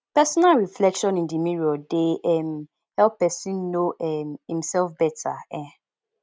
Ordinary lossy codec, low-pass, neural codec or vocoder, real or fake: none; none; none; real